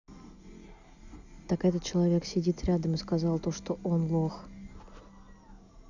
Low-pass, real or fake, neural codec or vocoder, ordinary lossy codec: 7.2 kHz; real; none; none